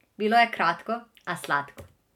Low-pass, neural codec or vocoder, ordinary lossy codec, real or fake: 19.8 kHz; none; none; real